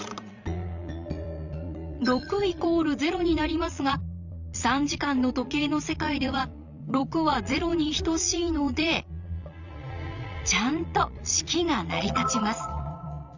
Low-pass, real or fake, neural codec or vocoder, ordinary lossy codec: 7.2 kHz; fake; vocoder, 22.05 kHz, 80 mel bands, Vocos; Opus, 64 kbps